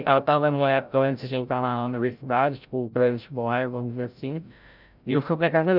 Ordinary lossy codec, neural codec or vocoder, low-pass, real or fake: none; codec, 16 kHz, 0.5 kbps, FreqCodec, larger model; 5.4 kHz; fake